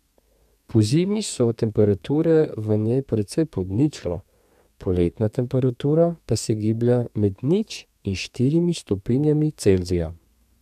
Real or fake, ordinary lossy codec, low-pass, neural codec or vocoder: fake; none; 14.4 kHz; codec, 32 kHz, 1.9 kbps, SNAC